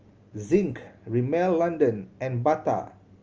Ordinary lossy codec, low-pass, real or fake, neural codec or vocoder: Opus, 24 kbps; 7.2 kHz; real; none